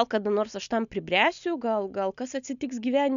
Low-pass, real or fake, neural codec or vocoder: 7.2 kHz; real; none